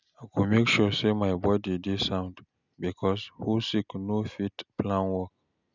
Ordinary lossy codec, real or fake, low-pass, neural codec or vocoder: none; real; 7.2 kHz; none